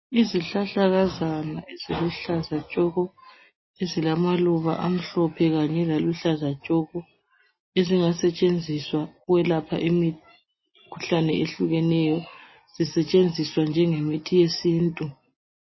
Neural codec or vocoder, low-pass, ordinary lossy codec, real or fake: none; 7.2 kHz; MP3, 24 kbps; real